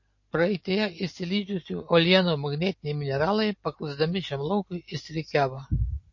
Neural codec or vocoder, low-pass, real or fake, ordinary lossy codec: none; 7.2 kHz; real; MP3, 32 kbps